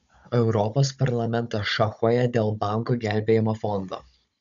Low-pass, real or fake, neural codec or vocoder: 7.2 kHz; fake; codec, 16 kHz, 16 kbps, FunCodec, trained on Chinese and English, 50 frames a second